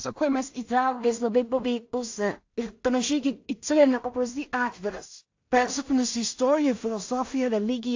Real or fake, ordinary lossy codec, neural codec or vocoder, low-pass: fake; AAC, 48 kbps; codec, 16 kHz in and 24 kHz out, 0.4 kbps, LongCat-Audio-Codec, two codebook decoder; 7.2 kHz